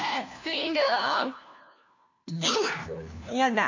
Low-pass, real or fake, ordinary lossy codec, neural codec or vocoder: 7.2 kHz; fake; AAC, 48 kbps; codec, 16 kHz, 1 kbps, FunCodec, trained on LibriTTS, 50 frames a second